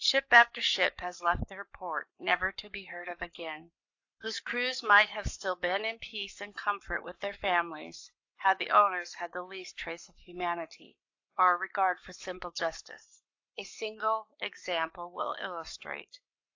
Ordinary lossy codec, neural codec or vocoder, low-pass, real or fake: AAC, 48 kbps; codec, 44.1 kHz, 7.8 kbps, Pupu-Codec; 7.2 kHz; fake